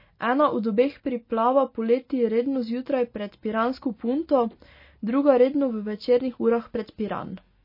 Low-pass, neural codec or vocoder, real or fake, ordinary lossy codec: 5.4 kHz; none; real; MP3, 24 kbps